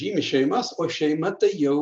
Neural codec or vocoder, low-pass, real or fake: none; 9.9 kHz; real